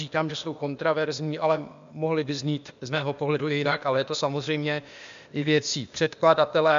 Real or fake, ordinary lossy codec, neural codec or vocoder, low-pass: fake; MP3, 64 kbps; codec, 16 kHz, 0.8 kbps, ZipCodec; 7.2 kHz